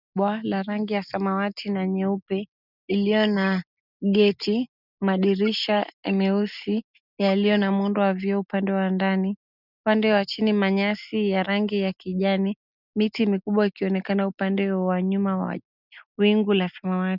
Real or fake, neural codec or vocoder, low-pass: real; none; 5.4 kHz